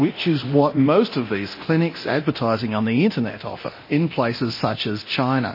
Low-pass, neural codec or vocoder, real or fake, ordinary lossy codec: 5.4 kHz; codec, 24 kHz, 0.9 kbps, DualCodec; fake; MP3, 24 kbps